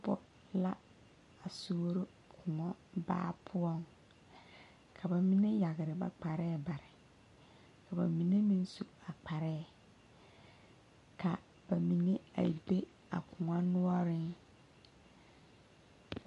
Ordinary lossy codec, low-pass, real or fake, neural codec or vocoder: MP3, 48 kbps; 14.4 kHz; real; none